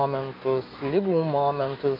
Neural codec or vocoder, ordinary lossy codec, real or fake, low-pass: autoencoder, 48 kHz, 128 numbers a frame, DAC-VAE, trained on Japanese speech; MP3, 32 kbps; fake; 5.4 kHz